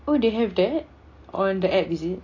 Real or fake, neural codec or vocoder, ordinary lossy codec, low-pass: real; none; AAC, 32 kbps; 7.2 kHz